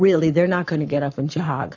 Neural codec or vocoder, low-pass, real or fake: codec, 44.1 kHz, 7.8 kbps, Pupu-Codec; 7.2 kHz; fake